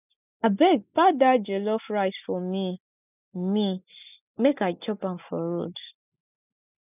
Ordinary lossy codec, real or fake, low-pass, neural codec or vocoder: none; real; 3.6 kHz; none